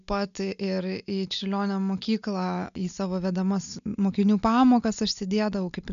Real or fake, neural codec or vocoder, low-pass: real; none; 7.2 kHz